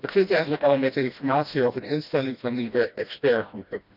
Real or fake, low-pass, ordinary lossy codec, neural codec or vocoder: fake; 5.4 kHz; none; codec, 16 kHz, 1 kbps, FreqCodec, smaller model